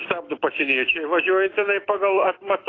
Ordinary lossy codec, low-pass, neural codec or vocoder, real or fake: AAC, 32 kbps; 7.2 kHz; autoencoder, 48 kHz, 128 numbers a frame, DAC-VAE, trained on Japanese speech; fake